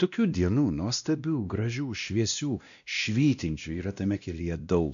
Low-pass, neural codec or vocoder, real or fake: 7.2 kHz; codec, 16 kHz, 1 kbps, X-Codec, WavLM features, trained on Multilingual LibriSpeech; fake